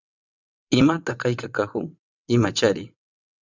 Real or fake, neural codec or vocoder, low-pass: fake; vocoder, 22.05 kHz, 80 mel bands, WaveNeXt; 7.2 kHz